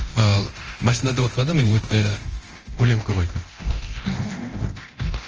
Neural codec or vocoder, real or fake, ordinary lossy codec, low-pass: codec, 24 kHz, 0.9 kbps, DualCodec; fake; Opus, 24 kbps; 7.2 kHz